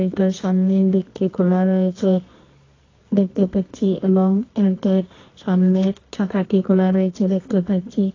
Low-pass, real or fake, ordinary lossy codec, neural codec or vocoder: 7.2 kHz; fake; AAC, 32 kbps; codec, 24 kHz, 0.9 kbps, WavTokenizer, medium music audio release